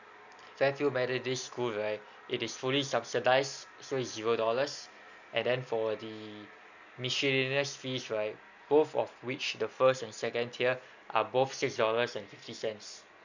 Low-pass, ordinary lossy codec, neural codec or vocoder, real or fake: 7.2 kHz; none; none; real